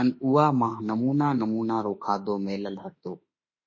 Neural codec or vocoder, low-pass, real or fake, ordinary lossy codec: autoencoder, 48 kHz, 32 numbers a frame, DAC-VAE, trained on Japanese speech; 7.2 kHz; fake; MP3, 32 kbps